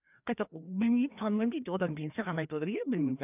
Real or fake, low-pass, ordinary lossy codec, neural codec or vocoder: fake; 3.6 kHz; none; codec, 16 kHz, 2 kbps, FreqCodec, larger model